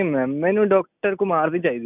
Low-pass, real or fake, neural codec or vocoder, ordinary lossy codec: 3.6 kHz; real; none; none